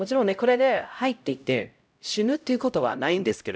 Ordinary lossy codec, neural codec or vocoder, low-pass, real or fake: none; codec, 16 kHz, 0.5 kbps, X-Codec, HuBERT features, trained on LibriSpeech; none; fake